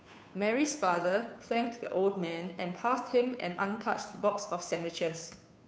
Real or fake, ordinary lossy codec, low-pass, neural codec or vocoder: fake; none; none; codec, 16 kHz, 2 kbps, FunCodec, trained on Chinese and English, 25 frames a second